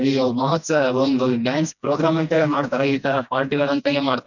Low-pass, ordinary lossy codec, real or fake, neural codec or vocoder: 7.2 kHz; none; fake; codec, 16 kHz, 1 kbps, FreqCodec, smaller model